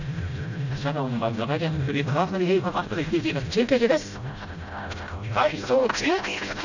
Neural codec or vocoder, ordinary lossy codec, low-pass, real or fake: codec, 16 kHz, 0.5 kbps, FreqCodec, smaller model; none; 7.2 kHz; fake